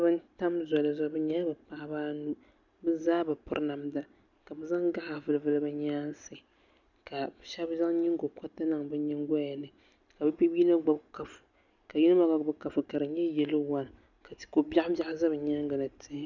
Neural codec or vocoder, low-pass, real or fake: none; 7.2 kHz; real